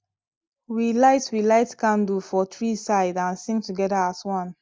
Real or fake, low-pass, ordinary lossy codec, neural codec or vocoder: real; none; none; none